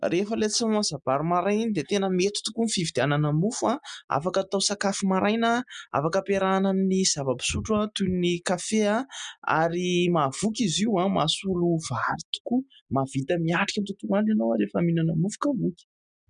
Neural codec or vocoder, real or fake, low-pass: none; real; 9.9 kHz